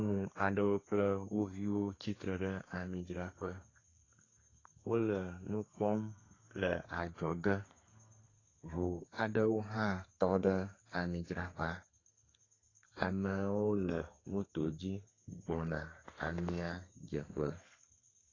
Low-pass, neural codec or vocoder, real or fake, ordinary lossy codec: 7.2 kHz; codec, 32 kHz, 1.9 kbps, SNAC; fake; AAC, 32 kbps